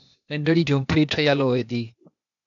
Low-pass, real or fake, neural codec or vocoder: 7.2 kHz; fake; codec, 16 kHz, 0.8 kbps, ZipCodec